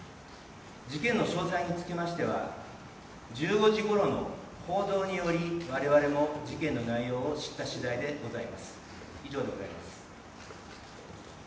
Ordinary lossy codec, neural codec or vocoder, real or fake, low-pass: none; none; real; none